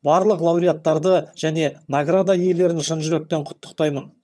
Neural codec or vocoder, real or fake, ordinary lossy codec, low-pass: vocoder, 22.05 kHz, 80 mel bands, HiFi-GAN; fake; none; none